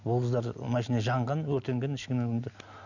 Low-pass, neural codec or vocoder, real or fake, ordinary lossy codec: 7.2 kHz; none; real; none